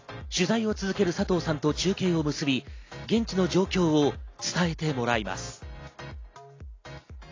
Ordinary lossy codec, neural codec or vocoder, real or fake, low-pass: AAC, 32 kbps; none; real; 7.2 kHz